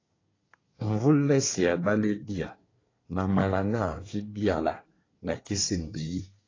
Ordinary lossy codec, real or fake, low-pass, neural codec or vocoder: AAC, 32 kbps; fake; 7.2 kHz; codec, 24 kHz, 1 kbps, SNAC